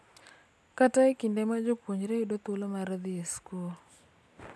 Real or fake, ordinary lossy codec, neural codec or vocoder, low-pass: real; none; none; none